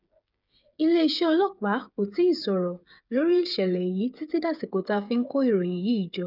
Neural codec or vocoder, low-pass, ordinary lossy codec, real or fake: codec, 16 kHz, 8 kbps, FreqCodec, smaller model; 5.4 kHz; none; fake